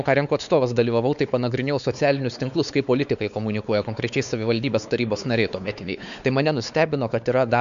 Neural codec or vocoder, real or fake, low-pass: codec, 16 kHz, 4 kbps, X-Codec, WavLM features, trained on Multilingual LibriSpeech; fake; 7.2 kHz